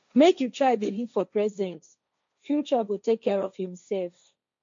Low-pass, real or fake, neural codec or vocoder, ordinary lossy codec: 7.2 kHz; fake; codec, 16 kHz, 1.1 kbps, Voila-Tokenizer; MP3, 48 kbps